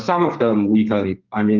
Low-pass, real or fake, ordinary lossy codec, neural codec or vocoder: 7.2 kHz; fake; Opus, 32 kbps; codec, 32 kHz, 1.9 kbps, SNAC